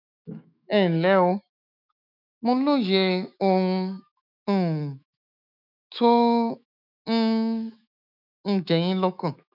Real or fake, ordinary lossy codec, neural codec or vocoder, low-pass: fake; none; autoencoder, 48 kHz, 128 numbers a frame, DAC-VAE, trained on Japanese speech; 5.4 kHz